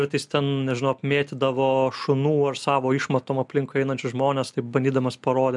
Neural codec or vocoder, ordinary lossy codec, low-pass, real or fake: none; MP3, 96 kbps; 10.8 kHz; real